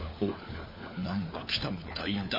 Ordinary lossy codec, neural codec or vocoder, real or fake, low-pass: MP3, 24 kbps; codec, 16 kHz, 4 kbps, FunCodec, trained on LibriTTS, 50 frames a second; fake; 5.4 kHz